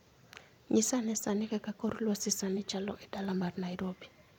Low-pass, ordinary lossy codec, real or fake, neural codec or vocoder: 19.8 kHz; none; fake; vocoder, 44.1 kHz, 128 mel bands every 512 samples, BigVGAN v2